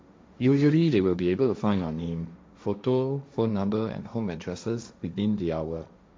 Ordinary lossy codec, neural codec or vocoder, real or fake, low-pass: none; codec, 16 kHz, 1.1 kbps, Voila-Tokenizer; fake; none